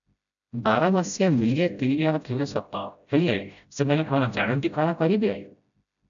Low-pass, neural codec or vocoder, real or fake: 7.2 kHz; codec, 16 kHz, 0.5 kbps, FreqCodec, smaller model; fake